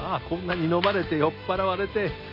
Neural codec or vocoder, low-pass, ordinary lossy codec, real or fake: none; 5.4 kHz; none; real